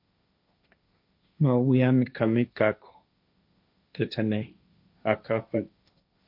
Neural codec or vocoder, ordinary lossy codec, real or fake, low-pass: codec, 16 kHz, 1.1 kbps, Voila-Tokenizer; MP3, 48 kbps; fake; 5.4 kHz